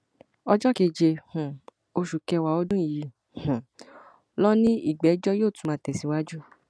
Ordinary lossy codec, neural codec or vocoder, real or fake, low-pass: none; none; real; none